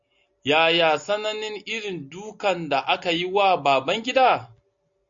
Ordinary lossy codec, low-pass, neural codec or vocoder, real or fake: MP3, 64 kbps; 7.2 kHz; none; real